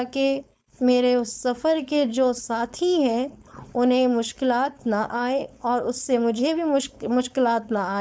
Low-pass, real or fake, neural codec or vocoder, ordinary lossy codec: none; fake; codec, 16 kHz, 4.8 kbps, FACodec; none